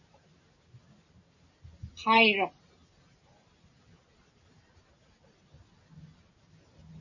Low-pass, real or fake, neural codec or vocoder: 7.2 kHz; real; none